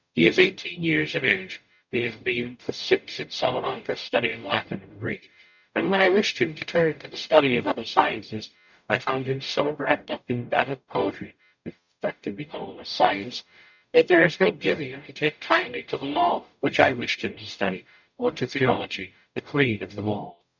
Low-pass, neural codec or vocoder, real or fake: 7.2 kHz; codec, 44.1 kHz, 0.9 kbps, DAC; fake